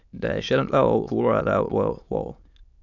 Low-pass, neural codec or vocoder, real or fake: 7.2 kHz; autoencoder, 22.05 kHz, a latent of 192 numbers a frame, VITS, trained on many speakers; fake